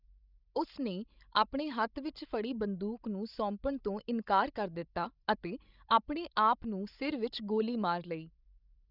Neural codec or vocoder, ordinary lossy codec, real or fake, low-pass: codec, 16 kHz, 16 kbps, FreqCodec, larger model; none; fake; 5.4 kHz